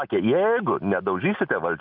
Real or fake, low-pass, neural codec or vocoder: real; 5.4 kHz; none